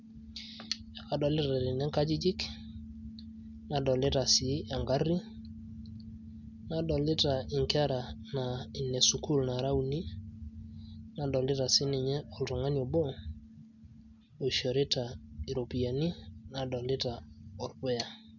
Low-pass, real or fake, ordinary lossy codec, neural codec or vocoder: 7.2 kHz; real; none; none